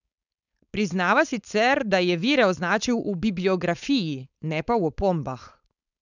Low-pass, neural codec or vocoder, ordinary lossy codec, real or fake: 7.2 kHz; codec, 16 kHz, 4.8 kbps, FACodec; none; fake